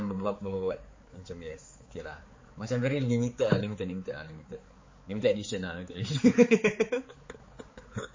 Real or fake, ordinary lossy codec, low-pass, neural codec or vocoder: fake; MP3, 32 kbps; 7.2 kHz; codec, 16 kHz, 4 kbps, X-Codec, HuBERT features, trained on balanced general audio